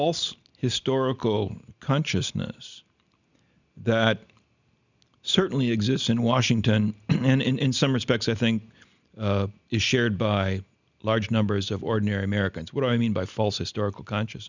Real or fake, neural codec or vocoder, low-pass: real; none; 7.2 kHz